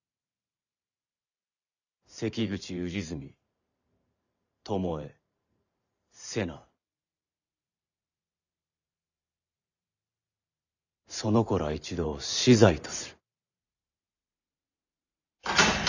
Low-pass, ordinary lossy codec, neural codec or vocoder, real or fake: 7.2 kHz; none; vocoder, 44.1 kHz, 128 mel bands every 512 samples, BigVGAN v2; fake